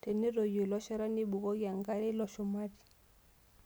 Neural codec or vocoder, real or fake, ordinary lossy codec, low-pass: none; real; none; none